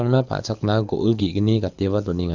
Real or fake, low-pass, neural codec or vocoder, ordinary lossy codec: fake; 7.2 kHz; codec, 24 kHz, 6 kbps, HILCodec; none